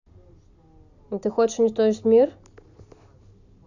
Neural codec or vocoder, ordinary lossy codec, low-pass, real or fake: none; none; 7.2 kHz; real